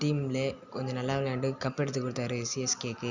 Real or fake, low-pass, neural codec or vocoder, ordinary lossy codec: real; 7.2 kHz; none; Opus, 64 kbps